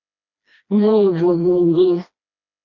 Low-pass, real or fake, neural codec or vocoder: 7.2 kHz; fake; codec, 16 kHz, 1 kbps, FreqCodec, smaller model